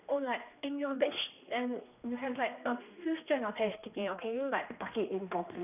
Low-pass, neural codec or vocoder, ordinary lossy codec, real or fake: 3.6 kHz; codec, 16 kHz, 2 kbps, X-Codec, HuBERT features, trained on general audio; none; fake